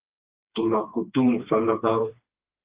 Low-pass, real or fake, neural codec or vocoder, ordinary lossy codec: 3.6 kHz; fake; codec, 16 kHz, 2 kbps, FreqCodec, smaller model; Opus, 24 kbps